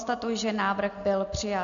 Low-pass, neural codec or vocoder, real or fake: 7.2 kHz; none; real